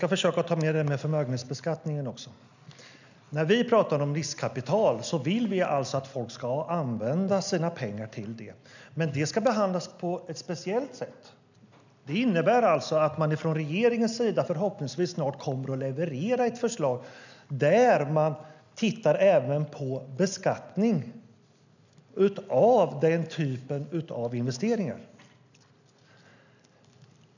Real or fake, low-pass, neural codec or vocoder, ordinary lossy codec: real; 7.2 kHz; none; none